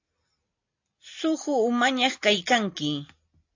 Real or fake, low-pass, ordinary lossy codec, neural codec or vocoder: real; 7.2 kHz; AAC, 48 kbps; none